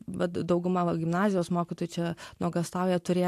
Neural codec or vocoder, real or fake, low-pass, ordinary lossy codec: none; real; 14.4 kHz; AAC, 64 kbps